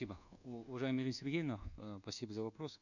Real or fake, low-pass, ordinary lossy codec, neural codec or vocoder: fake; 7.2 kHz; none; codec, 24 kHz, 1.2 kbps, DualCodec